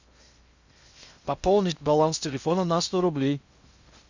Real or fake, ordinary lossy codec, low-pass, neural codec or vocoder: fake; Opus, 64 kbps; 7.2 kHz; codec, 16 kHz in and 24 kHz out, 0.6 kbps, FocalCodec, streaming, 2048 codes